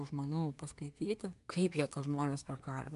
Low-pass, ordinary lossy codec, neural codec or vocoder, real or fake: 10.8 kHz; MP3, 96 kbps; codec, 24 kHz, 1 kbps, SNAC; fake